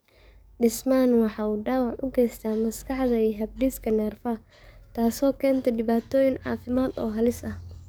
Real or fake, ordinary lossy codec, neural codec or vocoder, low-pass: fake; none; codec, 44.1 kHz, 7.8 kbps, DAC; none